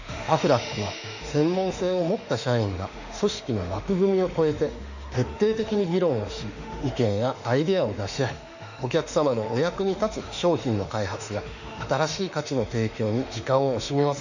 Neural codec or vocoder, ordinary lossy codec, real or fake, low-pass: autoencoder, 48 kHz, 32 numbers a frame, DAC-VAE, trained on Japanese speech; none; fake; 7.2 kHz